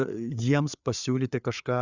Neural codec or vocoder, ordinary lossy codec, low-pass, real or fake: codec, 16 kHz, 4 kbps, FreqCodec, larger model; Opus, 64 kbps; 7.2 kHz; fake